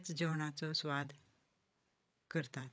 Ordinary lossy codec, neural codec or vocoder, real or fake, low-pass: none; codec, 16 kHz, 8 kbps, FreqCodec, larger model; fake; none